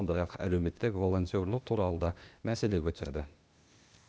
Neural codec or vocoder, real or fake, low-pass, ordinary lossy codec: codec, 16 kHz, 0.8 kbps, ZipCodec; fake; none; none